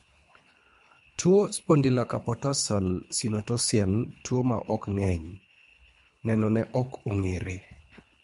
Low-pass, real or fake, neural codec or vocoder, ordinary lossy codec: 10.8 kHz; fake; codec, 24 kHz, 3 kbps, HILCodec; MP3, 64 kbps